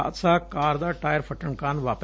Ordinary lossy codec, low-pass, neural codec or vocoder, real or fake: none; none; none; real